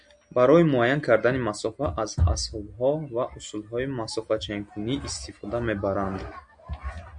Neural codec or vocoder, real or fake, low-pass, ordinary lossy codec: none; real; 9.9 kHz; MP3, 64 kbps